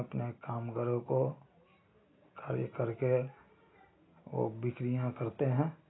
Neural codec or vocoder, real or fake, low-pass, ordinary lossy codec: none; real; 7.2 kHz; AAC, 16 kbps